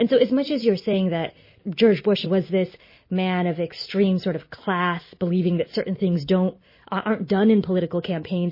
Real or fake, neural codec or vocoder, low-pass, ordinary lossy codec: real; none; 5.4 kHz; MP3, 24 kbps